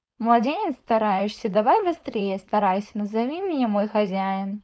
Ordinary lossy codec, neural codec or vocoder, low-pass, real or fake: none; codec, 16 kHz, 4.8 kbps, FACodec; none; fake